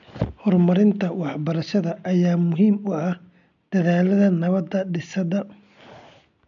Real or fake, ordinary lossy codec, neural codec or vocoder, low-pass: real; none; none; 7.2 kHz